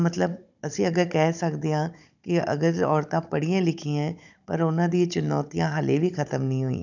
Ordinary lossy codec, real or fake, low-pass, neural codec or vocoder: none; fake; 7.2 kHz; codec, 16 kHz, 16 kbps, FunCodec, trained on Chinese and English, 50 frames a second